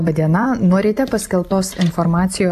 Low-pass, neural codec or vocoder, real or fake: 14.4 kHz; none; real